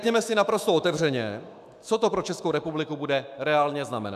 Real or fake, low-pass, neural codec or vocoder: fake; 14.4 kHz; autoencoder, 48 kHz, 128 numbers a frame, DAC-VAE, trained on Japanese speech